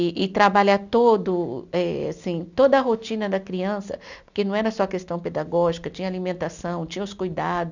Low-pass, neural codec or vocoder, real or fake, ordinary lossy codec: 7.2 kHz; none; real; none